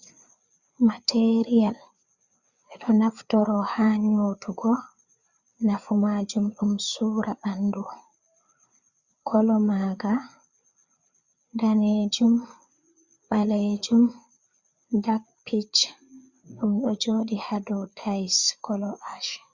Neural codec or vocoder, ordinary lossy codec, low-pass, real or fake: codec, 16 kHz, 4 kbps, FreqCodec, larger model; Opus, 64 kbps; 7.2 kHz; fake